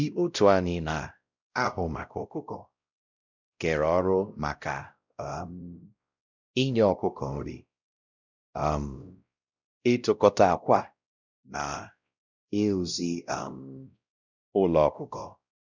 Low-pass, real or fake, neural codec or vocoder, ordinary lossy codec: 7.2 kHz; fake; codec, 16 kHz, 0.5 kbps, X-Codec, WavLM features, trained on Multilingual LibriSpeech; none